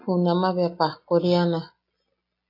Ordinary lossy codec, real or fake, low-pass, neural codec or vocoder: AAC, 32 kbps; real; 5.4 kHz; none